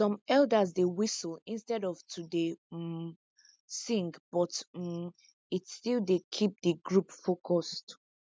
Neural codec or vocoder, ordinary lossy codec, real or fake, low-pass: none; none; real; none